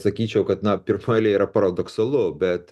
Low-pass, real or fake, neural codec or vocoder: 14.4 kHz; real; none